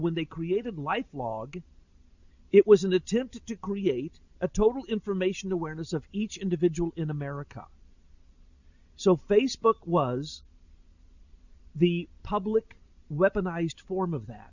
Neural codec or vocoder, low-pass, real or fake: none; 7.2 kHz; real